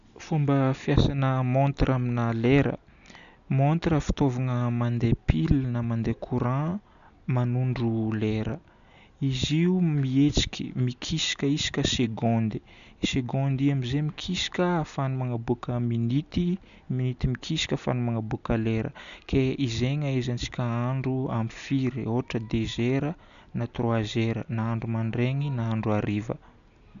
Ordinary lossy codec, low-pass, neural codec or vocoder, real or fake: none; 7.2 kHz; none; real